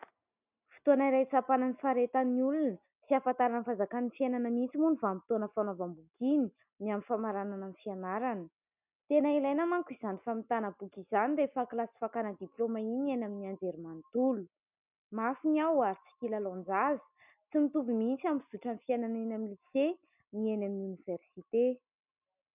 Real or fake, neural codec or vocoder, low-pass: real; none; 3.6 kHz